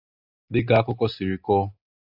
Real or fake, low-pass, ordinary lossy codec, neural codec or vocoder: real; 5.4 kHz; AAC, 48 kbps; none